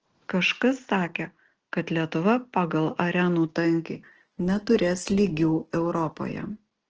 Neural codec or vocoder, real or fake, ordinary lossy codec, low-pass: none; real; Opus, 16 kbps; 7.2 kHz